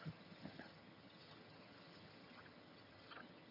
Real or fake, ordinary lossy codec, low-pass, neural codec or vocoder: fake; none; 5.4 kHz; vocoder, 22.05 kHz, 80 mel bands, HiFi-GAN